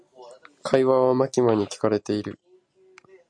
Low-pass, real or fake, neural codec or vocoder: 9.9 kHz; real; none